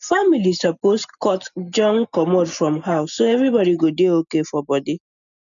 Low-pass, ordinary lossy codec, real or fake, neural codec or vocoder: 7.2 kHz; none; real; none